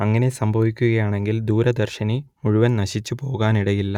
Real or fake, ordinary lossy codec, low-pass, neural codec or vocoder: real; none; 19.8 kHz; none